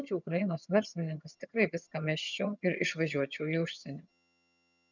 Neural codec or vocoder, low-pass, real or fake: vocoder, 22.05 kHz, 80 mel bands, HiFi-GAN; 7.2 kHz; fake